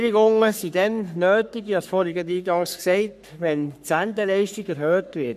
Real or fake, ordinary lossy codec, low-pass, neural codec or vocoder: fake; none; 14.4 kHz; codec, 44.1 kHz, 3.4 kbps, Pupu-Codec